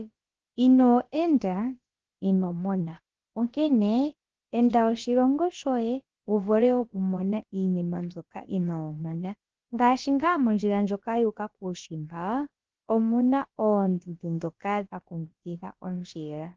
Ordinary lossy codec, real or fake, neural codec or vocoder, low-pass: Opus, 16 kbps; fake; codec, 16 kHz, about 1 kbps, DyCAST, with the encoder's durations; 7.2 kHz